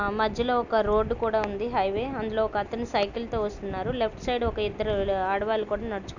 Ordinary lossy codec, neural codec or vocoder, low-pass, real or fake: none; none; 7.2 kHz; real